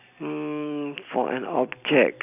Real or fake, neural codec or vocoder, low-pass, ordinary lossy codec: fake; vocoder, 44.1 kHz, 128 mel bands every 256 samples, BigVGAN v2; 3.6 kHz; none